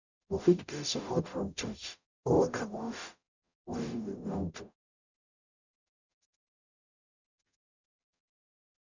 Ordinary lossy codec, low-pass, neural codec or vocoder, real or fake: none; 7.2 kHz; codec, 44.1 kHz, 0.9 kbps, DAC; fake